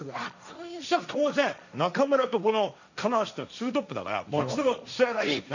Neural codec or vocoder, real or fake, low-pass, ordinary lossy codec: codec, 16 kHz, 1.1 kbps, Voila-Tokenizer; fake; 7.2 kHz; none